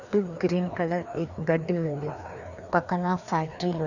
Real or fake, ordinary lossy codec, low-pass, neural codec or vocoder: fake; none; 7.2 kHz; codec, 16 kHz, 2 kbps, FreqCodec, larger model